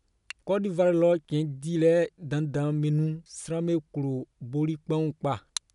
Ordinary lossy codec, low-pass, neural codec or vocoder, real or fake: none; 10.8 kHz; none; real